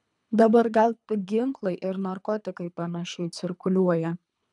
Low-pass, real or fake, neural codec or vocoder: 10.8 kHz; fake; codec, 24 kHz, 3 kbps, HILCodec